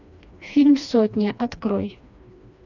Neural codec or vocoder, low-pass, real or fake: codec, 16 kHz, 2 kbps, FreqCodec, smaller model; 7.2 kHz; fake